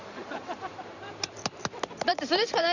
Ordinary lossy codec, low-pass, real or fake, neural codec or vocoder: none; 7.2 kHz; fake; vocoder, 44.1 kHz, 128 mel bands every 512 samples, BigVGAN v2